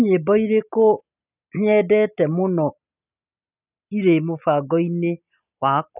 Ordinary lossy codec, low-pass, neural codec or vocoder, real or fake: none; 3.6 kHz; none; real